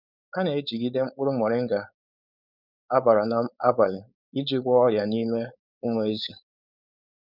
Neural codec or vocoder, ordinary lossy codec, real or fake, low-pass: codec, 16 kHz, 4.8 kbps, FACodec; none; fake; 5.4 kHz